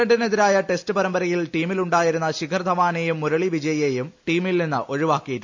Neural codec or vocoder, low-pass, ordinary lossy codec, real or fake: none; 7.2 kHz; MP3, 48 kbps; real